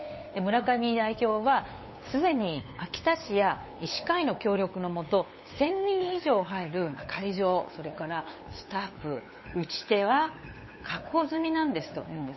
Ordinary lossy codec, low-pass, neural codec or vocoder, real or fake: MP3, 24 kbps; 7.2 kHz; codec, 16 kHz, 8 kbps, FunCodec, trained on LibriTTS, 25 frames a second; fake